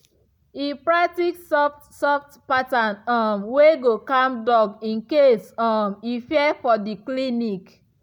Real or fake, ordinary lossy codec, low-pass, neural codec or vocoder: real; none; none; none